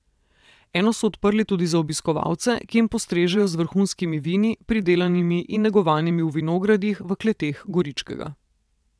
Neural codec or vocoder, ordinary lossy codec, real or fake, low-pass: vocoder, 22.05 kHz, 80 mel bands, WaveNeXt; none; fake; none